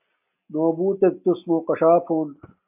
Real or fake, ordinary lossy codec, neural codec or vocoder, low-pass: real; AAC, 32 kbps; none; 3.6 kHz